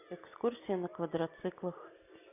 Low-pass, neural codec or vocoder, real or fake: 3.6 kHz; none; real